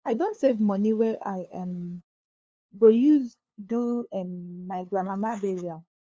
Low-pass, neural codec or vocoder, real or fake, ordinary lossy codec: none; codec, 16 kHz, 2 kbps, FunCodec, trained on LibriTTS, 25 frames a second; fake; none